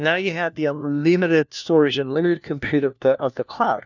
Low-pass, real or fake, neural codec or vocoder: 7.2 kHz; fake; codec, 16 kHz, 1 kbps, FunCodec, trained on LibriTTS, 50 frames a second